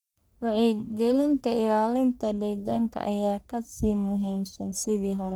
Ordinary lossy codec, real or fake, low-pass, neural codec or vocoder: none; fake; none; codec, 44.1 kHz, 1.7 kbps, Pupu-Codec